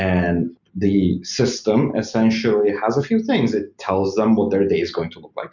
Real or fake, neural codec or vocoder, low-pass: real; none; 7.2 kHz